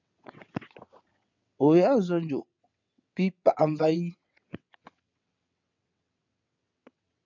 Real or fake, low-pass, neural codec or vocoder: fake; 7.2 kHz; vocoder, 22.05 kHz, 80 mel bands, WaveNeXt